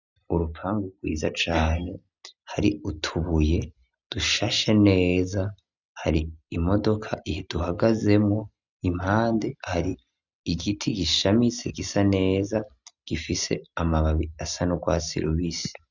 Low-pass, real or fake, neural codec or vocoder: 7.2 kHz; real; none